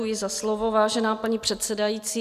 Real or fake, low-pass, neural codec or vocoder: real; 14.4 kHz; none